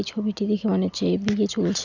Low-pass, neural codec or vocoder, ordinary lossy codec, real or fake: 7.2 kHz; none; none; real